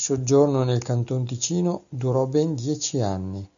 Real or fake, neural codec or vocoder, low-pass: real; none; 7.2 kHz